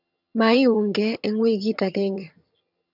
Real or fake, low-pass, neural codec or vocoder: fake; 5.4 kHz; vocoder, 22.05 kHz, 80 mel bands, HiFi-GAN